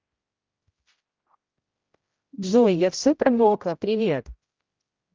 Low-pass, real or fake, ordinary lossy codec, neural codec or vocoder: 7.2 kHz; fake; Opus, 32 kbps; codec, 16 kHz, 0.5 kbps, X-Codec, HuBERT features, trained on general audio